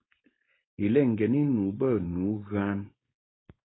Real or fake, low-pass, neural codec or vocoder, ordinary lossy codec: fake; 7.2 kHz; codec, 16 kHz, 4.8 kbps, FACodec; AAC, 16 kbps